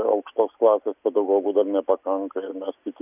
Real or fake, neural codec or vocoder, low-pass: real; none; 3.6 kHz